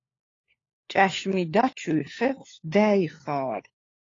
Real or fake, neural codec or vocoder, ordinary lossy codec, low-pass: fake; codec, 16 kHz, 4 kbps, FunCodec, trained on LibriTTS, 50 frames a second; AAC, 32 kbps; 7.2 kHz